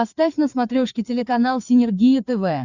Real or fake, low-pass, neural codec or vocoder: fake; 7.2 kHz; codec, 16 kHz, 4 kbps, FreqCodec, larger model